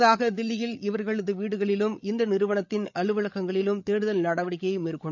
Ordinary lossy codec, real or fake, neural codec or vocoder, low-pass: none; fake; codec, 16 kHz, 8 kbps, FreqCodec, larger model; 7.2 kHz